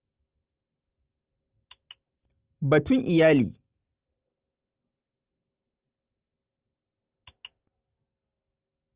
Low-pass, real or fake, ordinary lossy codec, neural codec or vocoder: 3.6 kHz; real; Opus, 24 kbps; none